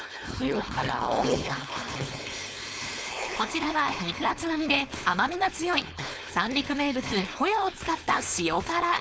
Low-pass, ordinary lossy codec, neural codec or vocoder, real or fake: none; none; codec, 16 kHz, 4.8 kbps, FACodec; fake